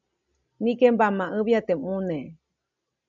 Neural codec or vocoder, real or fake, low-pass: none; real; 7.2 kHz